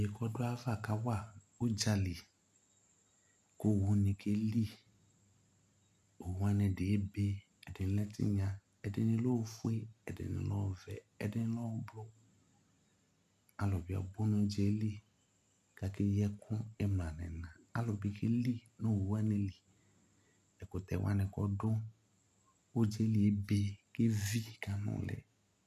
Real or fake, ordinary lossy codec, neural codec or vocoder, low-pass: real; MP3, 96 kbps; none; 14.4 kHz